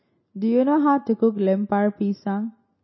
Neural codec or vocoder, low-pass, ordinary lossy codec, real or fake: none; 7.2 kHz; MP3, 24 kbps; real